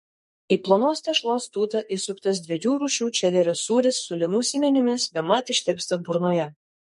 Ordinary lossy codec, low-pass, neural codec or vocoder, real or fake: MP3, 48 kbps; 14.4 kHz; codec, 44.1 kHz, 2.6 kbps, SNAC; fake